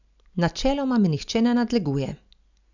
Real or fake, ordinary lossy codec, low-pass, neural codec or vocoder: real; none; 7.2 kHz; none